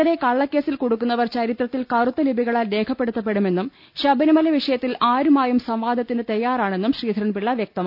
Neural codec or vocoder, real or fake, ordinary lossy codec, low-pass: none; real; none; 5.4 kHz